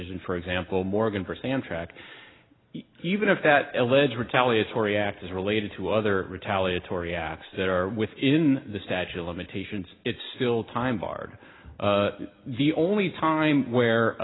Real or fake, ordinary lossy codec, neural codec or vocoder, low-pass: real; AAC, 16 kbps; none; 7.2 kHz